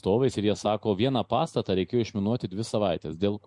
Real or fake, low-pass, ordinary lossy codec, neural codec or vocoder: real; 10.8 kHz; MP3, 64 kbps; none